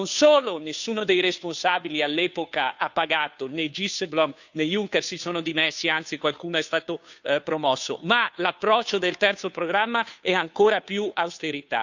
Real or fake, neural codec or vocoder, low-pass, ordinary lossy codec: fake; codec, 16 kHz, 2 kbps, FunCodec, trained on Chinese and English, 25 frames a second; 7.2 kHz; none